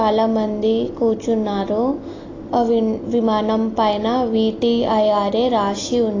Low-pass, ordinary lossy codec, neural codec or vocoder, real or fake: 7.2 kHz; AAC, 32 kbps; none; real